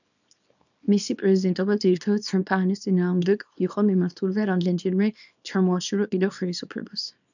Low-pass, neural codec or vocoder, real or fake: 7.2 kHz; codec, 24 kHz, 0.9 kbps, WavTokenizer, small release; fake